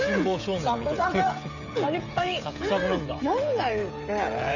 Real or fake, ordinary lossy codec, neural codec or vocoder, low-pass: fake; none; codec, 16 kHz, 16 kbps, FreqCodec, smaller model; 7.2 kHz